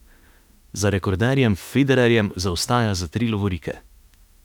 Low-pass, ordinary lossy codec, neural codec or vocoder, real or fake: 19.8 kHz; none; autoencoder, 48 kHz, 32 numbers a frame, DAC-VAE, trained on Japanese speech; fake